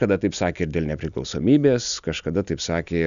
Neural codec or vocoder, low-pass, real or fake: none; 7.2 kHz; real